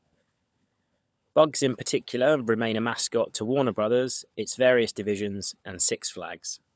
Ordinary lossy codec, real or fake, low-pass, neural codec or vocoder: none; fake; none; codec, 16 kHz, 16 kbps, FunCodec, trained on LibriTTS, 50 frames a second